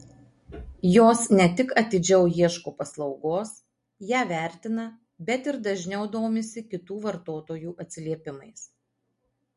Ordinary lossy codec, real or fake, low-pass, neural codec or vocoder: MP3, 48 kbps; real; 10.8 kHz; none